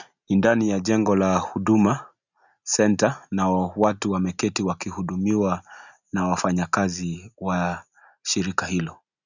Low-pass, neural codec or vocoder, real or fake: 7.2 kHz; none; real